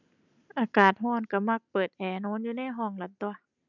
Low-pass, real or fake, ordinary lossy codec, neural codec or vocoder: 7.2 kHz; real; none; none